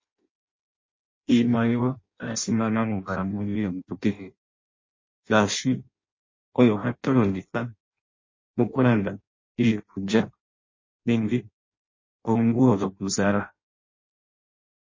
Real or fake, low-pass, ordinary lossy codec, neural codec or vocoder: fake; 7.2 kHz; MP3, 32 kbps; codec, 16 kHz in and 24 kHz out, 0.6 kbps, FireRedTTS-2 codec